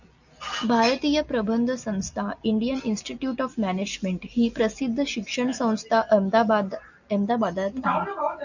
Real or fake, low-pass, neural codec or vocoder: real; 7.2 kHz; none